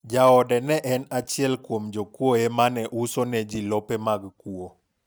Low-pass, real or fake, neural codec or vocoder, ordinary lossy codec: none; real; none; none